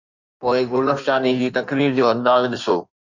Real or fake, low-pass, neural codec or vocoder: fake; 7.2 kHz; codec, 16 kHz in and 24 kHz out, 1.1 kbps, FireRedTTS-2 codec